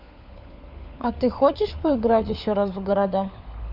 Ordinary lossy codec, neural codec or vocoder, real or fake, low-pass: none; codec, 16 kHz, 4 kbps, FunCodec, trained on LibriTTS, 50 frames a second; fake; 5.4 kHz